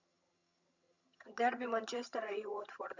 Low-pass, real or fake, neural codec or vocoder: 7.2 kHz; fake; vocoder, 22.05 kHz, 80 mel bands, HiFi-GAN